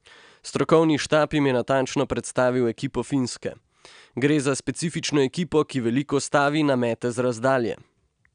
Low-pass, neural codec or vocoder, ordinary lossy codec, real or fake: 9.9 kHz; none; none; real